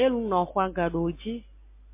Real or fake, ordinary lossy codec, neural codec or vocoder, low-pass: real; MP3, 24 kbps; none; 3.6 kHz